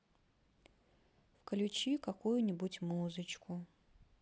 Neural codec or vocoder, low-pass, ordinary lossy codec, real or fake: none; none; none; real